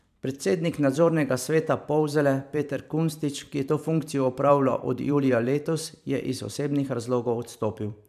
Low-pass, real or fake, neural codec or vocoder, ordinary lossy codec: 14.4 kHz; fake; vocoder, 44.1 kHz, 128 mel bands every 512 samples, BigVGAN v2; none